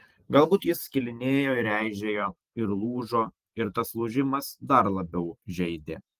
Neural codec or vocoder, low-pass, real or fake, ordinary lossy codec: vocoder, 44.1 kHz, 128 mel bands every 512 samples, BigVGAN v2; 14.4 kHz; fake; Opus, 24 kbps